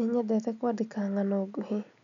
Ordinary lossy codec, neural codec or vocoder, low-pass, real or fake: none; none; 7.2 kHz; real